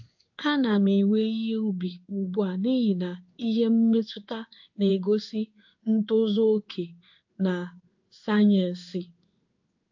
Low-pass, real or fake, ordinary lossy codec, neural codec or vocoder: 7.2 kHz; fake; AAC, 48 kbps; codec, 16 kHz in and 24 kHz out, 1 kbps, XY-Tokenizer